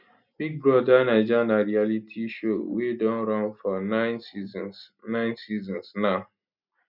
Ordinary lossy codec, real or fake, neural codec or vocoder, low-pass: none; real; none; 5.4 kHz